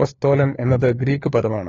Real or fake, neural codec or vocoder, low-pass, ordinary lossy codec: fake; codec, 16 kHz, 4 kbps, FunCodec, trained on LibriTTS, 50 frames a second; 7.2 kHz; AAC, 32 kbps